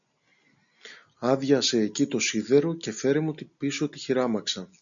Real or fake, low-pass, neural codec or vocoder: real; 7.2 kHz; none